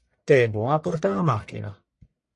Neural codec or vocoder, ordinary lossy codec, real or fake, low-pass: codec, 44.1 kHz, 1.7 kbps, Pupu-Codec; MP3, 48 kbps; fake; 10.8 kHz